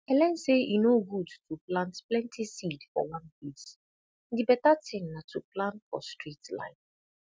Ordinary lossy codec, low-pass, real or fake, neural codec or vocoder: none; none; real; none